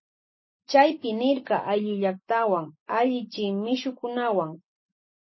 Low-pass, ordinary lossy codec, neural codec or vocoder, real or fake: 7.2 kHz; MP3, 24 kbps; none; real